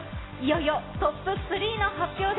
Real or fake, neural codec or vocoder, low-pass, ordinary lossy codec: real; none; 7.2 kHz; AAC, 16 kbps